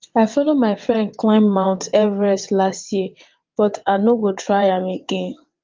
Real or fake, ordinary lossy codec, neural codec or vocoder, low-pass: fake; Opus, 24 kbps; vocoder, 22.05 kHz, 80 mel bands, WaveNeXt; 7.2 kHz